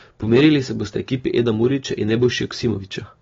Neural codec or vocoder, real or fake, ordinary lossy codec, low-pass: none; real; AAC, 24 kbps; 7.2 kHz